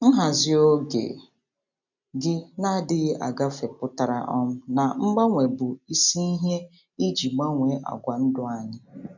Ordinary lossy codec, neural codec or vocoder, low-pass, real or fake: none; none; 7.2 kHz; real